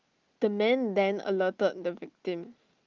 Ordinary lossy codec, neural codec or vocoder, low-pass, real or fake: Opus, 24 kbps; none; 7.2 kHz; real